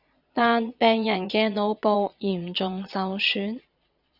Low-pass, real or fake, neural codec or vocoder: 5.4 kHz; fake; vocoder, 22.05 kHz, 80 mel bands, Vocos